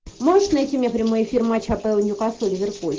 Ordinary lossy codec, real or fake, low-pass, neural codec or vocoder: Opus, 16 kbps; real; 7.2 kHz; none